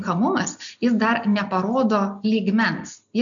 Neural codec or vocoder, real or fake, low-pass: none; real; 7.2 kHz